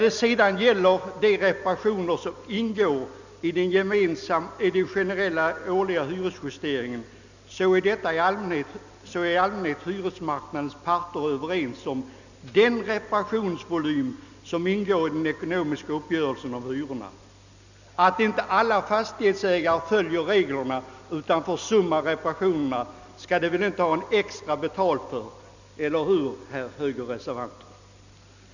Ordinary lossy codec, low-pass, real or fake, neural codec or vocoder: none; 7.2 kHz; real; none